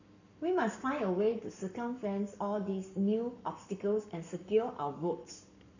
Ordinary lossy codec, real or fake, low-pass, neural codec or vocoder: none; fake; 7.2 kHz; codec, 44.1 kHz, 7.8 kbps, Pupu-Codec